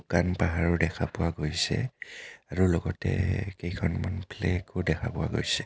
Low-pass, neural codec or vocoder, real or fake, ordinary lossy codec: none; none; real; none